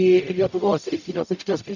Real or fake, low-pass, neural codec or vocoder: fake; 7.2 kHz; codec, 44.1 kHz, 0.9 kbps, DAC